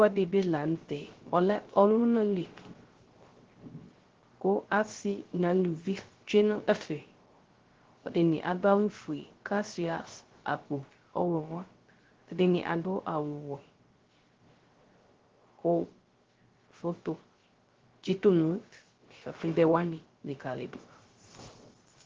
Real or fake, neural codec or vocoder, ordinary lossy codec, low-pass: fake; codec, 16 kHz, 0.3 kbps, FocalCodec; Opus, 16 kbps; 7.2 kHz